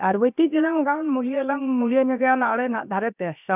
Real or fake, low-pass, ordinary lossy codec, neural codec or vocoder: fake; 3.6 kHz; none; codec, 16 kHz, about 1 kbps, DyCAST, with the encoder's durations